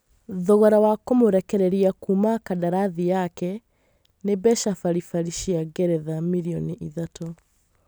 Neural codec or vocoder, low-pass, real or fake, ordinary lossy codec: none; none; real; none